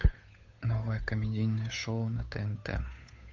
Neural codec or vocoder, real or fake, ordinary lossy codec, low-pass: codec, 16 kHz, 8 kbps, FunCodec, trained on Chinese and English, 25 frames a second; fake; AAC, 48 kbps; 7.2 kHz